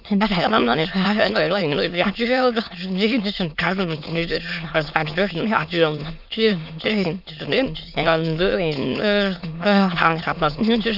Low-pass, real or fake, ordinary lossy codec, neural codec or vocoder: 5.4 kHz; fake; none; autoencoder, 22.05 kHz, a latent of 192 numbers a frame, VITS, trained on many speakers